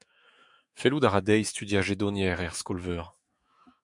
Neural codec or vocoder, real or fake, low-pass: autoencoder, 48 kHz, 128 numbers a frame, DAC-VAE, trained on Japanese speech; fake; 10.8 kHz